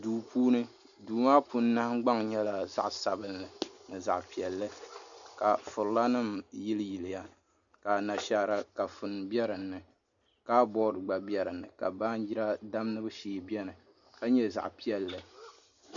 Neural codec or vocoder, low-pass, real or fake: none; 7.2 kHz; real